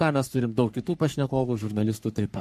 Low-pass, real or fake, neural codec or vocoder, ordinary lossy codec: 14.4 kHz; fake; codec, 44.1 kHz, 3.4 kbps, Pupu-Codec; MP3, 64 kbps